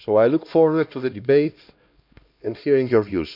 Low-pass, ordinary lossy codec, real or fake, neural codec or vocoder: 5.4 kHz; none; fake; codec, 16 kHz, 1 kbps, X-Codec, HuBERT features, trained on LibriSpeech